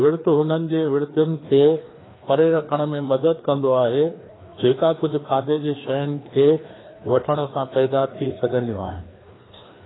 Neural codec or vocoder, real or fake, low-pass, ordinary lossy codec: codec, 16 kHz, 2 kbps, FreqCodec, larger model; fake; 7.2 kHz; AAC, 16 kbps